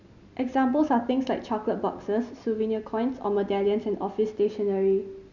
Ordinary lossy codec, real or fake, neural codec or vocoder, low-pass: none; real; none; 7.2 kHz